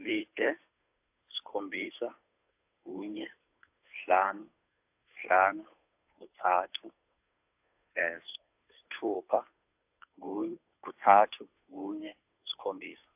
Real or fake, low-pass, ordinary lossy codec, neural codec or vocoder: fake; 3.6 kHz; AAC, 32 kbps; codec, 16 kHz, 2 kbps, FunCodec, trained on Chinese and English, 25 frames a second